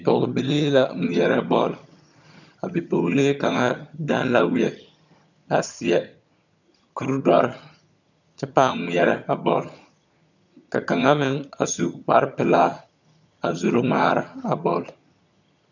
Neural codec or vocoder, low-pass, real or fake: vocoder, 22.05 kHz, 80 mel bands, HiFi-GAN; 7.2 kHz; fake